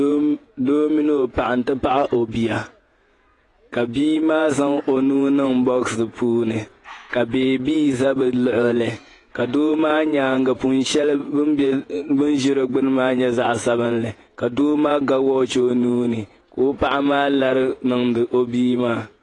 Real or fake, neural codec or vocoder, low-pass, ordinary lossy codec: fake; vocoder, 48 kHz, 128 mel bands, Vocos; 10.8 kHz; AAC, 32 kbps